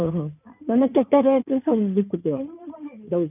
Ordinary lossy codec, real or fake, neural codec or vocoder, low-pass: none; fake; codec, 16 kHz in and 24 kHz out, 2.2 kbps, FireRedTTS-2 codec; 3.6 kHz